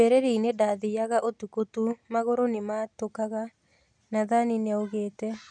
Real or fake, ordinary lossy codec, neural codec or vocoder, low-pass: real; none; none; 9.9 kHz